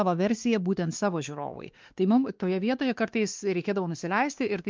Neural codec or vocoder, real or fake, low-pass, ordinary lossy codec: codec, 16 kHz, 2 kbps, X-Codec, WavLM features, trained on Multilingual LibriSpeech; fake; 7.2 kHz; Opus, 24 kbps